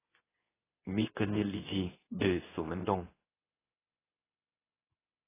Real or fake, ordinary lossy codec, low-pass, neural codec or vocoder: fake; AAC, 16 kbps; 3.6 kHz; codec, 24 kHz, 0.9 kbps, WavTokenizer, medium speech release version 2